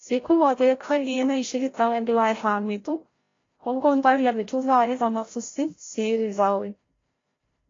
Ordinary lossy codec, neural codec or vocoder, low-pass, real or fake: AAC, 32 kbps; codec, 16 kHz, 0.5 kbps, FreqCodec, larger model; 7.2 kHz; fake